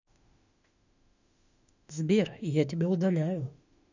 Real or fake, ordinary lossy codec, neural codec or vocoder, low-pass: fake; none; autoencoder, 48 kHz, 32 numbers a frame, DAC-VAE, trained on Japanese speech; 7.2 kHz